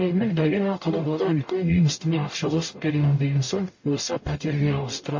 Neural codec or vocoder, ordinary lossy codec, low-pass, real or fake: codec, 44.1 kHz, 0.9 kbps, DAC; MP3, 32 kbps; 7.2 kHz; fake